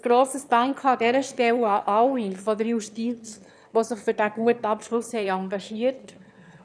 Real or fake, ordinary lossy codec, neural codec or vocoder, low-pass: fake; none; autoencoder, 22.05 kHz, a latent of 192 numbers a frame, VITS, trained on one speaker; none